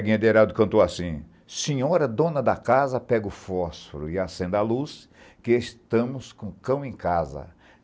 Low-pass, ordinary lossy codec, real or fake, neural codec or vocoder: none; none; real; none